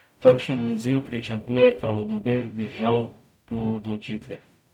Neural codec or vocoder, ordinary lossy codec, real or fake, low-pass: codec, 44.1 kHz, 0.9 kbps, DAC; none; fake; 19.8 kHz